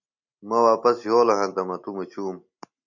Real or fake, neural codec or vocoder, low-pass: real; none; 7.2 kHz